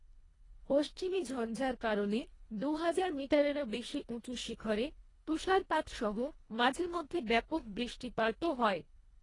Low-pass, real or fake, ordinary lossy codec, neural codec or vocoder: 10.8 kHz; fake; AAC, 32 kbps; codec, 24 kHz, 1.5 kbps, HILCodec